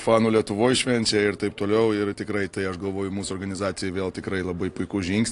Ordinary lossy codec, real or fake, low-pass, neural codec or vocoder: AAC, 48 kbps; real; 10.8 kHz; none